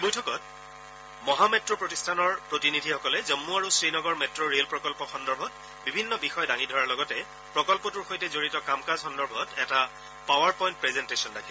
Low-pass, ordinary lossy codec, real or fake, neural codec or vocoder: none; none; real; none